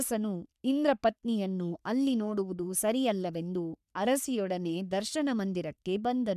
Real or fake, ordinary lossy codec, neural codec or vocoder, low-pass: fake; none; codec, 44.1 kHz, 3.4 kbps, Pupu-Codec; 14.4 kHz